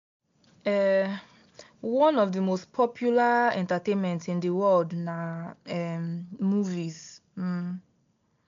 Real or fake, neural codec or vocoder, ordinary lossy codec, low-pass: real; none; MP3, 64 kbps; 7.2 kHz